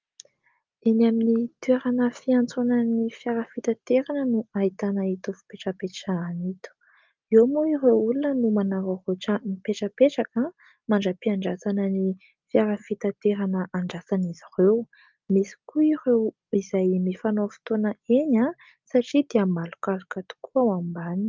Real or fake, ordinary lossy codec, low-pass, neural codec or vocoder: real; Opus, 32 kbps; 7.2 kHz; none